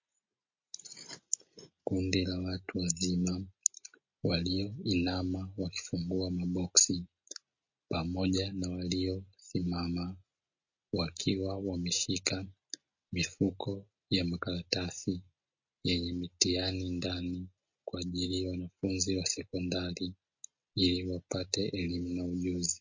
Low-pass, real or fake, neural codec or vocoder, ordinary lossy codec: 7.2 kHz; real; none; MP3, 32 kbps